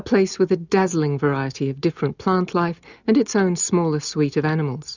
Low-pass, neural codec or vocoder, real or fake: 7.2 kHz; none; real